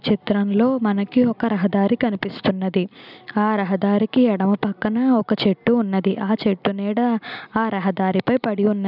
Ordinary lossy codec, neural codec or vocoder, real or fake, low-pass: none; none; real; 5.4 kHz